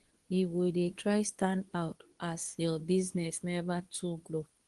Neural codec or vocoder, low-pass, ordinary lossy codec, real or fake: codec, 24 kHz, 0.9 kbps, WavTokenizer, medium speech release version 2; 10.8 kHz; Opus, 24 kbps; fake